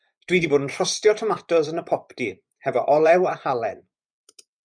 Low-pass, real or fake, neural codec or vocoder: 9.9 kHz; fake; vocoder, 24 kHz, 100 mel bands, Vocos